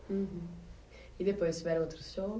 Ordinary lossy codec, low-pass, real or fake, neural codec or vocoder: none; none; real; none